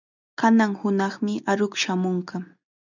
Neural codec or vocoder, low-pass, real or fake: none; 7.2 kHz; real